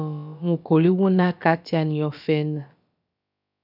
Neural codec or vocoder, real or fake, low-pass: codec, 16 kHz, about 1 kbps, DyCAST, with the encoder's durations; fake; 5.4 kHz